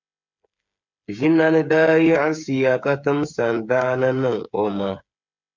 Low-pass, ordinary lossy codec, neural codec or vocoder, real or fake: 7.2 kHz; MP3, 64 kbps; codec, 16 kHz, 8 kbps, FreqCodec, smaller model; fake